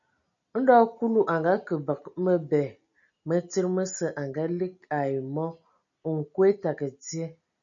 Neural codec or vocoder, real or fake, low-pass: none; real; 7.2 kHz